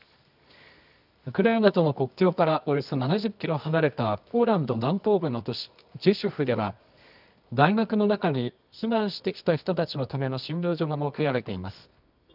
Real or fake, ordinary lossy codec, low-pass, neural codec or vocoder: fake; none; 5.4 kHz; codec, 24 kHz, 0.9 kbps, WavTokenizer, medium music audio release